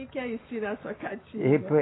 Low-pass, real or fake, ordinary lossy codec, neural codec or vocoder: 7.2 kHz; real; AAC, 16 kbps; none